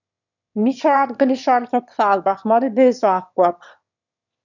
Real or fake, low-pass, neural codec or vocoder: fake; 7.2 kHz; autoencoder, 22.05 kHz, a latent of 192 numbers a frame, VITS, trained on one speaker